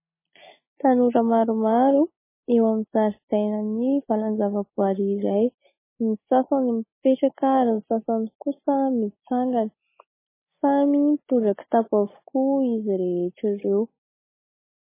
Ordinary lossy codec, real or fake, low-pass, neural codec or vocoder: MP3, 16 kbps; real; 3.6 kHz; none